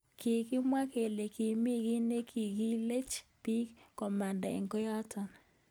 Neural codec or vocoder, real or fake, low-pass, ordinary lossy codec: none; real; none; none